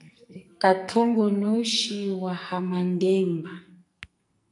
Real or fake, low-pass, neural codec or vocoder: fake; 10.8 kHz; codec, 44.1 kHz, 2.6 kbps, SNAC